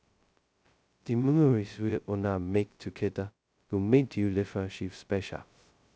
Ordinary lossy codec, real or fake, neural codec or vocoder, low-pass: none; fake; codec, 16 kHz, 0.2 kbps, FocalCodec; none